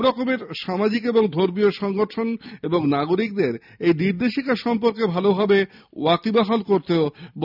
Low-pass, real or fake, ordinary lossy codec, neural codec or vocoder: 5.4 kHz; real; none; none